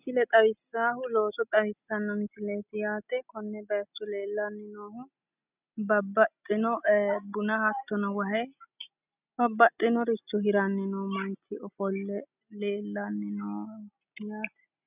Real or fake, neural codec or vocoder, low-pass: real; none; 3.6 kHz